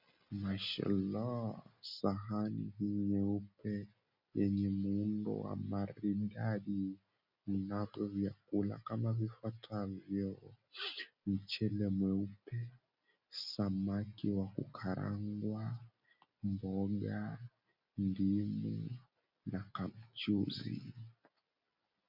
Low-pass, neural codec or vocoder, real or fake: 5.4 kHz; none; real